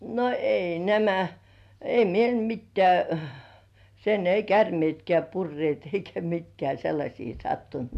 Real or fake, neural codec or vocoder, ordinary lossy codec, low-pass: real; none; AAC, 96 kbps; 14.4 kHz